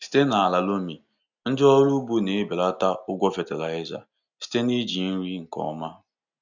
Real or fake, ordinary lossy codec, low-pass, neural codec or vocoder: real; none; 7.2 kHz; none